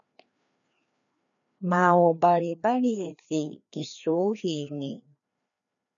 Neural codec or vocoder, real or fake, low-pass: codec, 16 kHz, 2 kbps, FreqCodec, larger model; fake; 7.2 kHz